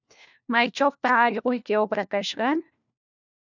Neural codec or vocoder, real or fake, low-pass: codec, 16 kHz, 1 kbps, FunCodec, trained on LibriTTS, 50 frames a second; fake; 7.2 kHz